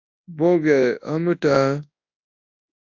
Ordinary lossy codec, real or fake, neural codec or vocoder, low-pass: AAC, 48 kbps; fake; codec, 24 kHz, 0.9 kbps, WavTokenizer, large speech release; 7.2 kHz